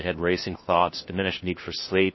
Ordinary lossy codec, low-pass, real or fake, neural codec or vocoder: MP3, 24 kbps; 7.2 kHz; fake; codec, 16 kHz, 1 kbps, FunCodec, trained on LibriTTS, 50 frames a second